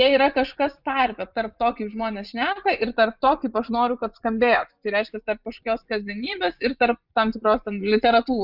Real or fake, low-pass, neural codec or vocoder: fake; 5.4 kHz; vocoder, 24 kHz, 100 mel bands, Vocos